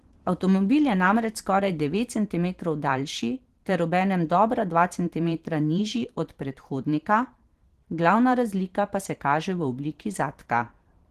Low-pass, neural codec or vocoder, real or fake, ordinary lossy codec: 14.4 kHz; vocoder, 44.1 kHz, 128 mel bands every 512 samples, BigVGAN v2; fake; Opus, 16 kbps